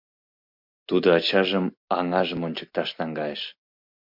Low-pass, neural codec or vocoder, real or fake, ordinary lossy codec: 5.4 kHz; none; real; MP3, 48 kbps